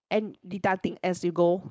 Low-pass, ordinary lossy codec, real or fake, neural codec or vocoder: none; none; fake; codec, 16 kHz, 4.8 kbps, FACodec